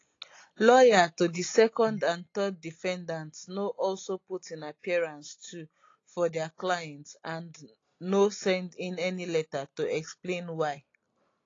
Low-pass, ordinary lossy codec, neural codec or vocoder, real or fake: 7.2 kHz; AAC, 32 kbps; none; real